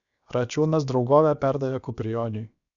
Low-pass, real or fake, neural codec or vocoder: 7.2 kHz; fake; codec, 16 kHz, about 1 kbps, DyCAST, with the encoder's durations